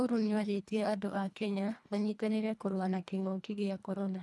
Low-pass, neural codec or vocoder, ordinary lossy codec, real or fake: none; codec, 24 kHz, 1.5 kbps, HILCodec; none; fake